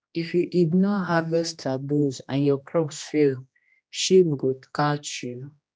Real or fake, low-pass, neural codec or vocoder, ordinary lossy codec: fake; none; codec, 16 kHz, 1 kbps, X-Codec, HuBERT features, trained on general audio; none